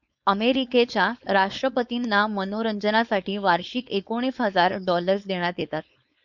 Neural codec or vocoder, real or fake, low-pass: codec, 16 kHz, 4.8 kbps, FACodec; fake; 7.2 kHz